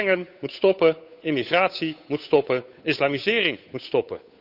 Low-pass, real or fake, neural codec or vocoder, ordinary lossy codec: 5.4 kHz; fake; codec, 16 kHz, 8 kbps, FunCodec, trained on Chinese and English, 25 frames a second; none